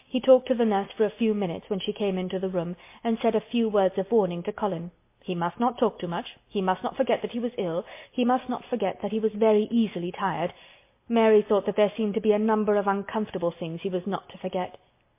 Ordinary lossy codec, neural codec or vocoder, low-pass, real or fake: MP3, 24 kbps; none; 3.6 kHz; real